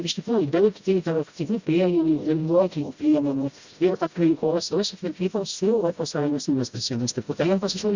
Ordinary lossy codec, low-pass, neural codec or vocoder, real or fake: Opus, 64 kbps; 7.2 kHz; codec, 16 kHz, 0.5 kbps, FreqCodec, smaller model; fake